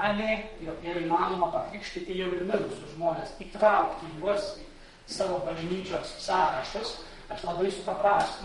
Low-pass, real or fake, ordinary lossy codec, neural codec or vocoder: 14.4 kHz; fake; MP3, 48 kbps; codec, 32 kHz, 1.9 kbps, SNAC